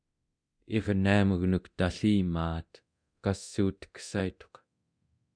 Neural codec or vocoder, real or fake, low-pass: codec, 24 kHz, 0.9 kbps, DualCodec; fake; 9.9 kHz